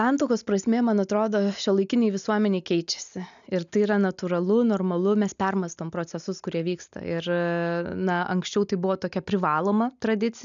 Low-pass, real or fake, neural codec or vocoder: 7.2 kHz; real; none